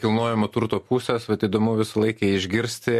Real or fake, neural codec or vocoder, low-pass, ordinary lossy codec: real; none; 14.4 kHz; MP3, 64 kbps